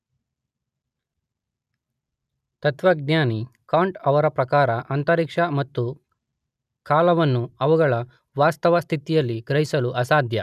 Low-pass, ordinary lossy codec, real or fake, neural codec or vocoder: 14.4 kHz; none; real; none